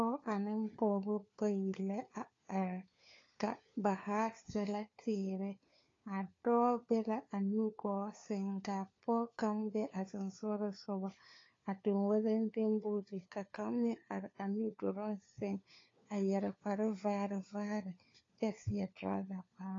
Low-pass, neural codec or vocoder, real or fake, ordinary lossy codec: 7.2 kHz; codec, 16 kHz, 4 kbps, FunCodec, trained on LibriTTS, 50 frames a second; fake; AAC, 32 kbps